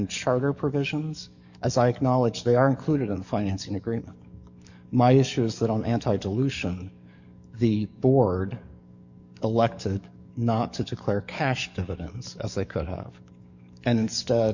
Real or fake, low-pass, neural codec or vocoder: fake; 7.2 kHz; codec, 44.1 kHz, 7.8 kbps, DAC